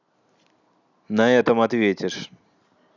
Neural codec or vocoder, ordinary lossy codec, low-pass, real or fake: none; none; 7.2 kHz; real